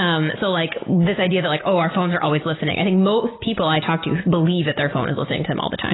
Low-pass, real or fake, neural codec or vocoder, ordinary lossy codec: 7.2 kHz; real; none; AAC, 16 kbps